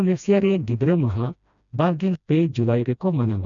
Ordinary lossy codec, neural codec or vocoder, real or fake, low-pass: none; codec, 16 kHz, 1 kbps, FreqCodec, smaller model; fake; 7.2 kHz